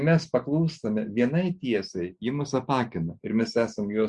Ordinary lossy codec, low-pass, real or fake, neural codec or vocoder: Opus, 64 kbps; 10.8 kHz; real; none